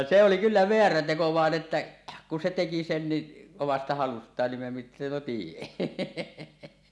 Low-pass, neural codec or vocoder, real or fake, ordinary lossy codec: none; none; real; none